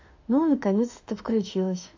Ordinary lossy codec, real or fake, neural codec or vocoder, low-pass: AAC, 48 kbps; fake; autoencoder, 48 kHz, 32 numbers a frame, DAC-VAE, trained on Japanese speech; 7.2 kHz